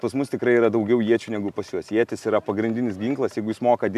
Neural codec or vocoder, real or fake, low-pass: none; real; 14.4 kHz